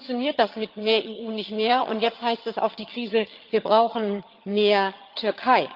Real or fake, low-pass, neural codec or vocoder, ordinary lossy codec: fake; 5.4 kHz; vocoder, 22.05 kHz, 80 mel bands, HiFi-GAN; Opus, 32 kbps